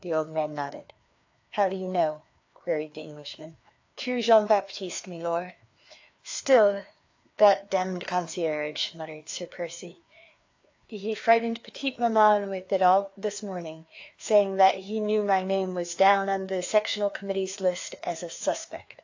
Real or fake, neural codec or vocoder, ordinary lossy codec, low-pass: fake; codec, 16 kHz, 2 kbps, FreqCodec, larger model; AAC, 48 kbps; 7.2 kHz